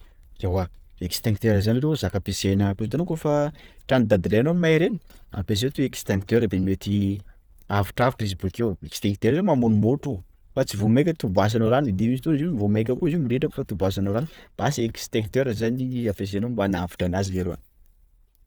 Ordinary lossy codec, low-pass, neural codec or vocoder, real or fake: none; none; none; real